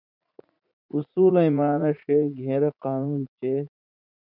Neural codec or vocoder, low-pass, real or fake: vocoder, 44.1 kHz, 80 mel bands, Vocos; 5.4 kHz; fake